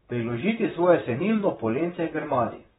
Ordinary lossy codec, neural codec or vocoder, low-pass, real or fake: AAC, 16 kbps; vocoder, 44.1 kHz, 128 mel bands, Pupu-Vocoder; 19.8 kHz; fake